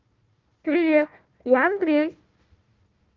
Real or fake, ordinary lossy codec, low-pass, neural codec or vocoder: fake; Opus, 32 kbps; 7.2 kHz; codec, 16 kHz, 1 kbps, FunCodec, trained on Chinese and English, 50 frames a second